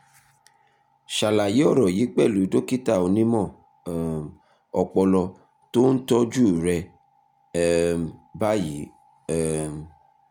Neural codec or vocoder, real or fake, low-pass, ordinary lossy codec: none; real; 19.8 kHz; MP3, 96 kbps